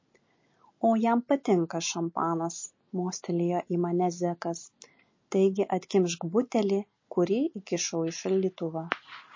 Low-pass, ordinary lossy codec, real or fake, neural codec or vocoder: 7.2 kHz; MP3, 32 kbps; real; none